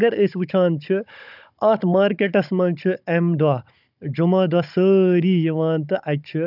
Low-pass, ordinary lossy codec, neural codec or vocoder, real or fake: 5.4 kHz; none; codec, 16 kHz, 16 kbps, FunCodec, trained on Chinese and English, 50 frames a second; fake